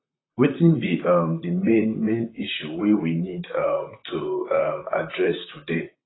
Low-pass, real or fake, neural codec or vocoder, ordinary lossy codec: 7.2 kHz; fake; vocoder, 44.1 kHz, 128 mel bands, Pupu-Vocoder; AAC, 16 kbps